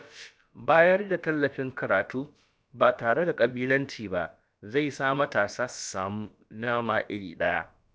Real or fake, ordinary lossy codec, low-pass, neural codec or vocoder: fake; none; none; codec, 16 kHz, about 1 kbps, DyCAST, with the encoder's durations